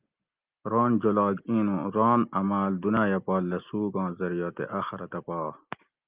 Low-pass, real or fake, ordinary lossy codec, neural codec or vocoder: 3.6 kHz; real; Opus, 32 kbps; none